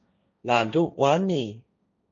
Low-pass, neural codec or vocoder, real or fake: 7.2 kHz; codec, 16 kHz, 1.1 kbps, Voila-Tokenizer; fake